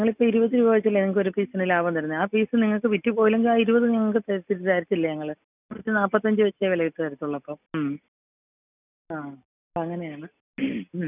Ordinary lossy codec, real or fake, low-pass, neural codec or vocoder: none; real; 3.6 kHz; none